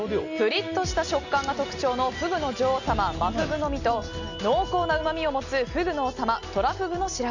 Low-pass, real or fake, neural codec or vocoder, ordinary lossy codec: 7.2 kHz; real; none; none